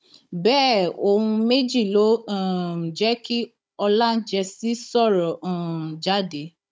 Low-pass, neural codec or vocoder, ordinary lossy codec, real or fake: none; codec, 16 kHz, 16 kbps, FunCodec, trained on Chinese and English, 50 frames a second; none; fake